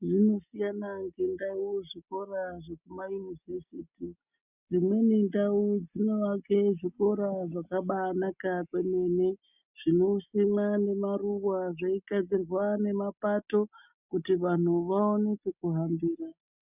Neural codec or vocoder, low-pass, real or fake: none; 3.6 kHz; real